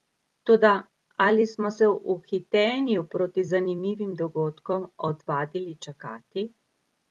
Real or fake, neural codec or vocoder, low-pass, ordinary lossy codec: fake; vocoder, 44.1 kHz, 128 mel bands every 256 samples, BigVGAN v2; 19.8 kHz; Opus, 32 kbps